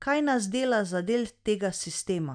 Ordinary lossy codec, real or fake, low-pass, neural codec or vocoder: none; real; 9.9 kHz; none